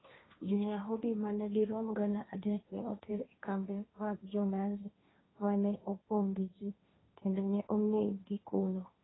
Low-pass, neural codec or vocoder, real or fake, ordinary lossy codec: 7.2 kHz; codec, 16 kHz, 1.1 kbps, Voila-Tokenizer; fake; AAC, 16 kbps